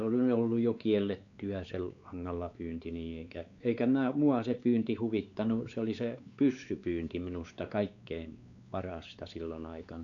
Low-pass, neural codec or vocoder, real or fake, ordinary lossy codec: 7.2 kHz; codec, 16 kHz, 4 kbps, X-Codec, WavLM features, trained on Multilingual LibriSpeech; fake; none